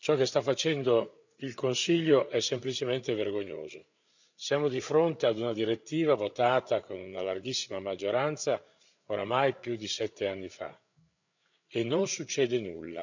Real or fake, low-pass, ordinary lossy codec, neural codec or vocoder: fake; 7.2 kHz; none; codec, 16 kHz, 8 kbps, FreqCodec, larger model